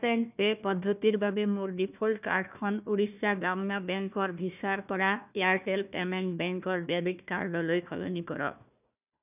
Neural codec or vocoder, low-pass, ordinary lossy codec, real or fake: codec, 16 kHz, 1 kbps, FunCodec, trained on Chinese and English, 50 frames a second; 3.6 kHz; none; fake